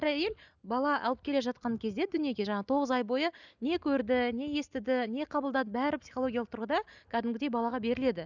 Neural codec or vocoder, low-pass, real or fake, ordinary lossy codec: none; 7.2 kHz; real; none